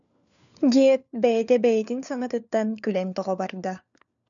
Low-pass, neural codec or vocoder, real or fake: 7.2 kHz; codec, 16 kHz, 4 kbps, FunCodec, trained on LibriTTS, 50 frames a second; fake